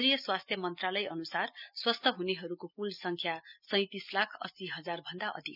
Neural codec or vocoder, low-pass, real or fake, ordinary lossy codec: none; 5.4 kHz; real; none